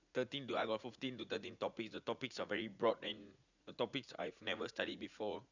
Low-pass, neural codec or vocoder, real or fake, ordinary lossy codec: 7.2 kHz; vocoder, 44.1 kHz, 80 mel bands, Vocos; fake; none